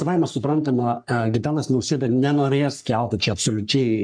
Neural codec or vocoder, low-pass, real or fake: codec, 44.1 kHz, 3.4 kbps, Pupu-Codec; 9.9 kHz; fake